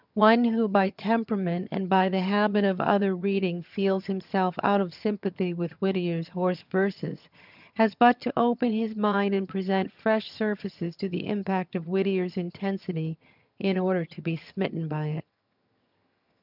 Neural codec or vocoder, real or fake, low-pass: vocoder, 22.05 kHz, 80 mel bands, HiFi-GAN; fake; 5.4 kHz